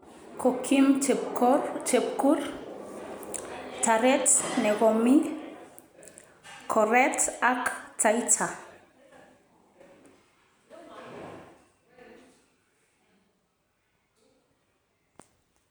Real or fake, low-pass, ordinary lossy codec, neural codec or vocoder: real; none; none; none